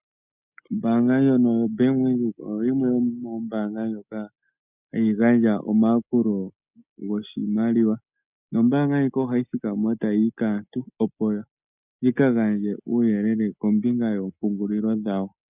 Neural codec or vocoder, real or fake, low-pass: none; real; 3.6 kHz